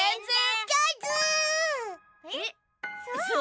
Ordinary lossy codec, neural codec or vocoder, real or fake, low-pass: none; none; real; none